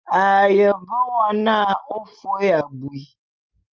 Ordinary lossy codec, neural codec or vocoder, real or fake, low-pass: Opus, 24 kbps; none; real; 7.2 kHz